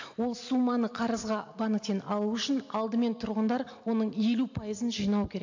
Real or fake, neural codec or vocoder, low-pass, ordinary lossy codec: real; none; 7.2 kHz; AAC, 48 kbps